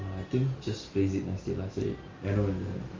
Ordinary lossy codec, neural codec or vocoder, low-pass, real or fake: Opus, 32 kbps; none; 7.2 kHz; real